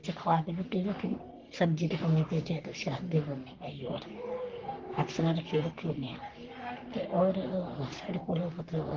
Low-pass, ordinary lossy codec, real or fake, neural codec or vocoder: 7.2 kHz; Opus, 16 kbps; fake; codec, 44.1 kHz, 3.4 kbps, Pupu-Codec